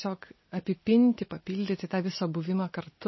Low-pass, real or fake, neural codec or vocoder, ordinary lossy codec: 7.2 kHz; real; none; MP3, 24 kbps